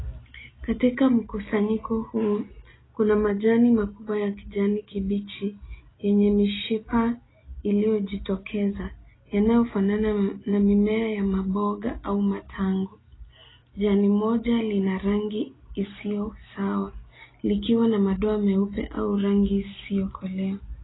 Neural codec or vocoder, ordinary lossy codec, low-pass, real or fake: none; AAC, 16 kbps; 7.2 kHz; real